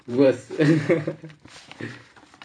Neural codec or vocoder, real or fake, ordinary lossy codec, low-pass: vocoder, 44.1 kHz, 128 mel bands every 512 samples, BigVGAN v2; fake; none; 9.9 kHz